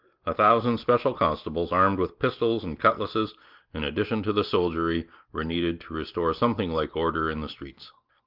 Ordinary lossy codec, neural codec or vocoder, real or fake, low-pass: Opus, 24 kbps; none; real; 5.4 kHz